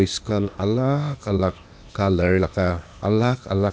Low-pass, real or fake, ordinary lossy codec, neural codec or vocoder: none; fake; none; codec, 16 kHz, 0.8 kbps, ZipCodec